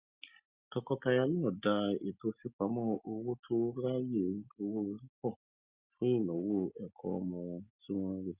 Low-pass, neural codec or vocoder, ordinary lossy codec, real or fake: 3.6 kHz; none; Opus, 64 kbps; real